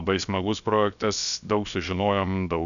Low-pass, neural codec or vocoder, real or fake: 7.2 kHz; codec, 16 kHz, about 1 kbps, DyCAST, with the encoder's durations; fake